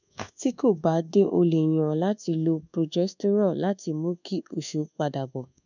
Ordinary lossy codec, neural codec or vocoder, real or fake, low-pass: none; codec, 24 kHz, 1.2 kbps, DualCodec; fake; 7.2 kHz